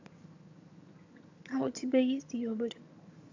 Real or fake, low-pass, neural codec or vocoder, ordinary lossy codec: fake; 7.2 kHz; vocoder, 22.05 kHz, 80 mel bands, HiFi-GAN; AAC, 48 kbps